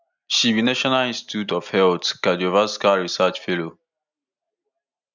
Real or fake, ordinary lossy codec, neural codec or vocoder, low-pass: real; none; none; 7.2 kHz